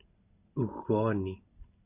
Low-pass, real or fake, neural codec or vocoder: 3.6 kHz; real; none